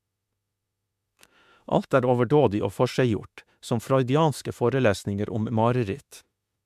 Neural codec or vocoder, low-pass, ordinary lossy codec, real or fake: autoencoder, 48 kHz, 32 numbers a frame, DAC-VAE, trained on Japanese speech; 14.4 kHz; MP3, 96 kbps; fake